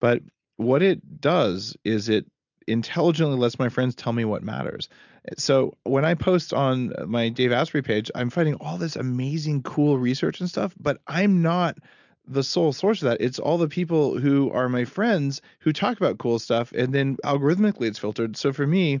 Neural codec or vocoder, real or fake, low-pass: none; real; 7.2 kHz